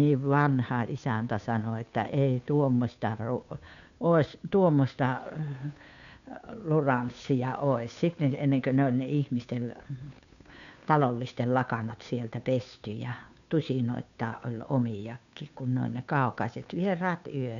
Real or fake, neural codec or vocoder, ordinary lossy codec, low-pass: fake; codec, 16 kHz, 2 kbps, FunCodec, trained on Chinese and English, 25 frames a second; none; 7.2 kHz